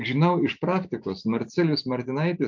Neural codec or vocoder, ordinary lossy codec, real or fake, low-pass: none; MP3, 64 kbps; real; 7.2 kHz